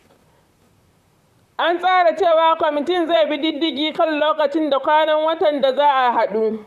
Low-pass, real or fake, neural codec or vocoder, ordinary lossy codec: 14.4 kHz; fake; vocoder, 44.1 kHz, 128 mel bands, Pupu-Vocoder; none